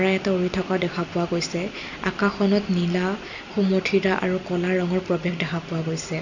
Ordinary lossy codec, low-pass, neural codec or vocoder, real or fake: none; 7.2 kHz; none; real